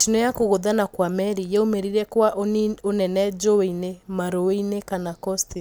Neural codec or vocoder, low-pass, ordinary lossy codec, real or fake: none; none; none; real